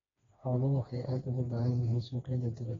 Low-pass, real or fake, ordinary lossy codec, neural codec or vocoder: 7.2 kHz; fake; AAC, 24 kbps; codec, 16 kHz, 2 kbps, FreqCodec, smaller model